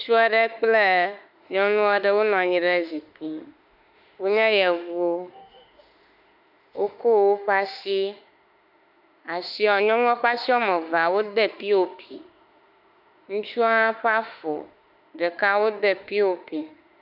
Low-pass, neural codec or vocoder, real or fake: 5.4 kHz; autoencoder, 48 kHz, 32 numbers a frame, DAC-VAE, trained on Japanese speech; fake